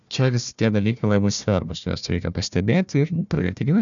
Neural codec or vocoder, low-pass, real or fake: codec, 16 kHz, 1 kbps, FunCodec, trained on Chinese and English, 50 frames a second; 7.2 kHz; fake